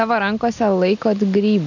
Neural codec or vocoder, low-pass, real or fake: none; 7.2 kHz; real